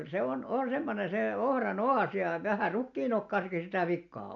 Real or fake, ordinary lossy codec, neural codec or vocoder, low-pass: real; MP3, 64 kbps; none; 7.2 kHz